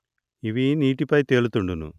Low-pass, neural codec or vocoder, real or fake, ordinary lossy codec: 14.4 kHz; none; real; none